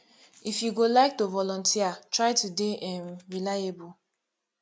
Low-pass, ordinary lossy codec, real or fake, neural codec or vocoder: none; none; real; none